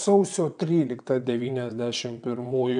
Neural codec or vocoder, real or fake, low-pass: vocoder, 22.05 kHz, 80 mel bands, WaveNeXt; fake; 9.9 kHz